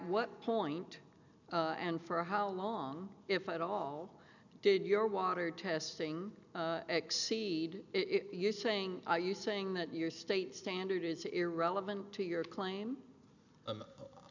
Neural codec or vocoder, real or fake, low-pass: none; real; 7.2 kHz